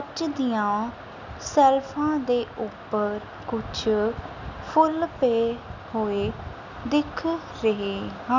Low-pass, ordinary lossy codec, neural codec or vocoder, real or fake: 7.2 kHz; none; none; real